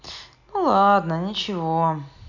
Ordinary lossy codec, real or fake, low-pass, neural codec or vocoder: none; real; 7.2 kHz; none